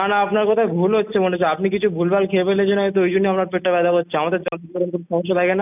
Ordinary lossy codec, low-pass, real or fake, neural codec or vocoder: none; 3.6 kHz; real; none